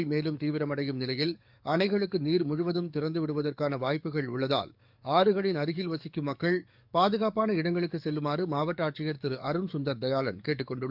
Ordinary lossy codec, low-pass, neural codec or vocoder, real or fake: none; 5.4 kHz; codec, 44.1 kHz, 7.8 kbps, DAC; fake